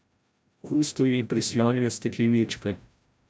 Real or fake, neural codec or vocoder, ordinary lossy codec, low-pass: fake; codec, 16 kHz, 0.5 kbps, FreqCodec, larger model; none; none